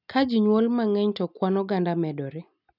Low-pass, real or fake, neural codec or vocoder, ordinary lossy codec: 5.4 kHz; real; none; none